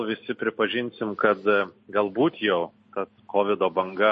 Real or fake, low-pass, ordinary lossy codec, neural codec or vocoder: real; 10.8 kHz; MP3, 32 kbps; none